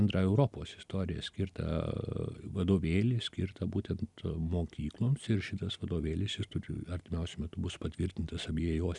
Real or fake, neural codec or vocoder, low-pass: real; none; 10.8 kHz